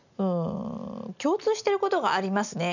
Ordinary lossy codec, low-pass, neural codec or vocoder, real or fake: none; 7.2 kHz; none; real